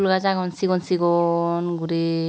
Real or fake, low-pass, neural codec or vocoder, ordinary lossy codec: real; none; none; none